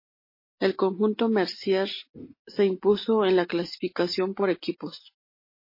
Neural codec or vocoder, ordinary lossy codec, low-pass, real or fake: none; MP3, 24 kbps; 5.4 kHz; real